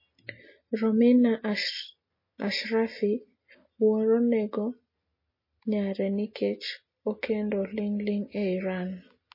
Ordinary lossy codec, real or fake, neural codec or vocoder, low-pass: MP3, 24 kbps; real; none; 5.4 kHz